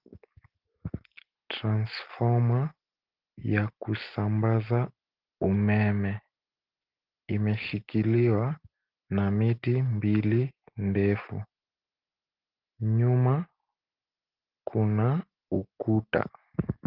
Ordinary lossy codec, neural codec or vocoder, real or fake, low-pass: Opus, 16 kbps; none; real; 5.4 kHz